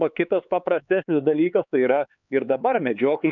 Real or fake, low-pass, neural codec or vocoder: fake; 7.2 kHz; codec, 16 kHz, 4 kbps, X-Codec, HuBERT features, trained on LibriSpeech